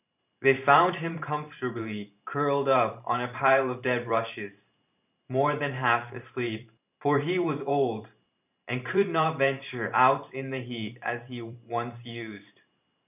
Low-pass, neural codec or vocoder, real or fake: 3.6 kHz; vocoder, 44.1 kHz, 128 mel bands every 512 samples, BigVGAN v2; fake